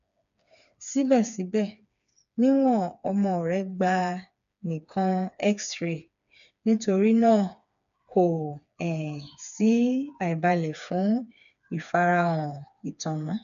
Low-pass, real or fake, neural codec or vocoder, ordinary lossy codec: 7.2 kHz; fake; codec, 16 kHz, 4 kbps, FreqCodec, smaller model; none